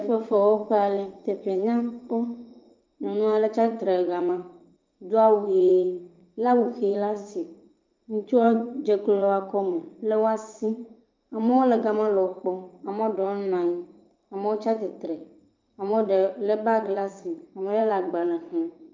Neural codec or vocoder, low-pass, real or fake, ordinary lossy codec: vocoder, 44.1 kHz, 80 mel bands, Vocos; 7.2 kHz; fake; Opus, 24 kbps